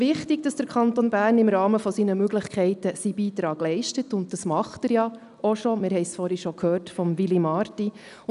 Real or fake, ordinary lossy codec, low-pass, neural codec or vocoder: real; none; 10.8 kHz; none